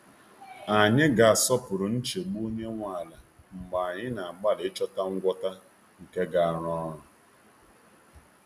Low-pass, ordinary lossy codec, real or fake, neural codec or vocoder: 14.4 kHz; none; real; none